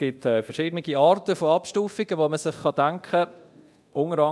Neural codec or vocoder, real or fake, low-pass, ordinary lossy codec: codec, 24 kHz, 0.9 kbps, DualCodec; fake; none; none